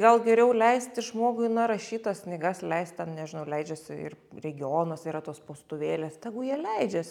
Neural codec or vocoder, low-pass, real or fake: none; 19.8 kHz; real